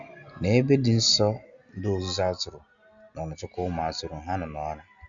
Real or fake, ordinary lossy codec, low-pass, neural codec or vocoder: real; Opus, 64 kbps; 7.2 kHz; none